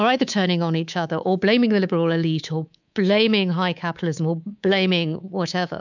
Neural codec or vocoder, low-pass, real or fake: codec, 24 kHz, 3.1 kbps, DualCodec; 7.2 kHz; fake